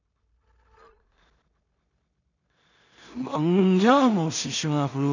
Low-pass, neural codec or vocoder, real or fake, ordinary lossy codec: 7.2 kHz; codec, 16 kHz in and 24 kHz out, 0.4 kbps, LongCat-Audio-Codec, two codebook decoder; fake; none